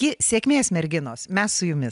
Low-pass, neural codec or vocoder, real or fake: 10.8 kHz; none; real